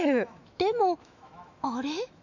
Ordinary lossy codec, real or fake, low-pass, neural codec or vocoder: none; fake; 7.2 kHz; autoencoder, 48 kHz, 128 numbers a frame, DAC-VAE, trained on Japanese speech